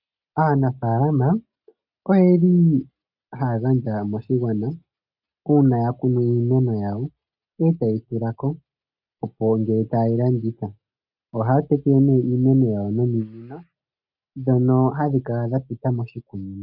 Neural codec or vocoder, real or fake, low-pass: none; real; 5.4 kHz